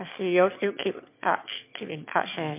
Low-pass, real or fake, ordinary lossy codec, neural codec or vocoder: 3.6 kHz; fake; MP3, 32 kbps; autoencoder, 22.05 kHz, a latent of 192 numbers a frame, VITS, trained on one speaker